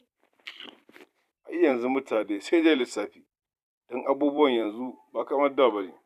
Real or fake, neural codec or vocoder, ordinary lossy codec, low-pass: fake; vocoder, 44.1 kHz, 128 mel bands every 256 samples, BigVGAN v2; none; 14.4 kHz